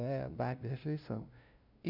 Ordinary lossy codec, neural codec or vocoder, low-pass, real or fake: none; codec, 16 kHz, 0.5 kbps, FunCodec, trained on LibriTTS, 25 frames a second; 5.4 kHz; fake